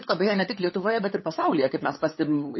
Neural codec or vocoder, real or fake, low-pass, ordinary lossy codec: codec, 16 kHz, 8 kbps, FunCodec, trained on LibriTTS, 25 frames a second; fake; 7.2 kHz; MP3, 24 kbps